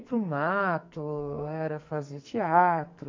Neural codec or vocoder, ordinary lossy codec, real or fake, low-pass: codec, 44.1 kHz, 2.6 kbps, SNAC; MP3, 64 kbps; fake; 7.2 kHz